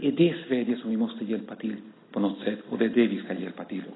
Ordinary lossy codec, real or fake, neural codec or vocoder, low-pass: AAC, 16 kbps; real; none; 7.2 kHz